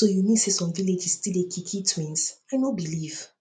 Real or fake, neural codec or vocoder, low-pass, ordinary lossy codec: real; none; 9.9 kHz; none